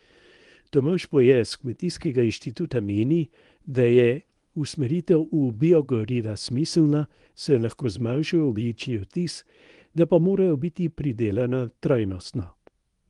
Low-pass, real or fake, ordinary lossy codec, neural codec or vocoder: 10.8 kHz; fake; Opus, 24 kbps; codec, 24 kHz, 0.9 kbps, WavTokenizer, medium speech release version 1